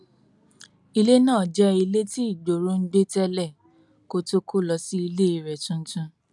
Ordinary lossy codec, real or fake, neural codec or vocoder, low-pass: none; real; none; 10.8 kHz